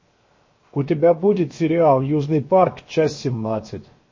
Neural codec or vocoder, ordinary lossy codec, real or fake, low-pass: codec, 16 kHz, 0.7 kbps, FocalCodec; MP3, 32 kbps; fake; 7.2 kHz